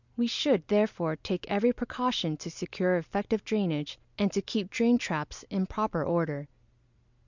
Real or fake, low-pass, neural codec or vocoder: real; 7.2 kHz; none